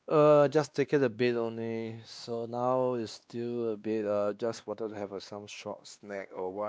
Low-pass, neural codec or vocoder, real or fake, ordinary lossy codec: none; codec, 16 kHz, 2 kbps, X-Codec, WavLM features, trained on Multilingual LibriSpeech; fake; none